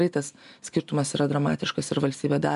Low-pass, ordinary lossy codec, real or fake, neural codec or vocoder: 10.8 kHz; MP3, 96 kbps; real; none